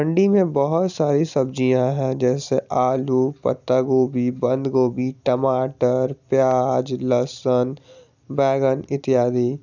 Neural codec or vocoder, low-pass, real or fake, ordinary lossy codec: none; 7.2 kHz; real; none